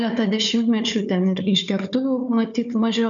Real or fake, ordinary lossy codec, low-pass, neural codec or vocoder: fake; MP3, 96 kbps; 7.2 kHz; codec, 16 kHz, 4 kbps, FunCodec, trained on Chinese and English, 50 frames a second